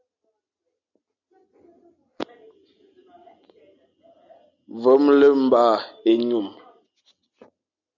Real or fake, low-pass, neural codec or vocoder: real; 7.2 kHz; none